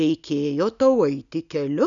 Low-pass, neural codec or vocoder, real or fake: 7.2 kHz; none; real